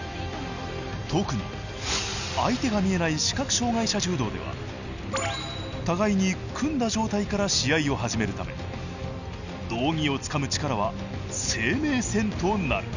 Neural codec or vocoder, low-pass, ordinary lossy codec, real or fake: none; 7.2 kHz; none; real